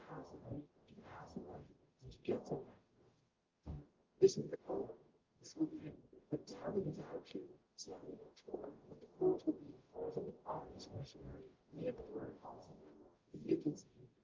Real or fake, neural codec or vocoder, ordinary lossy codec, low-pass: fake; codec, 44.1 kHz, 0.9 kbps, DAC; Opus, 32 kbps; 7.2 kHz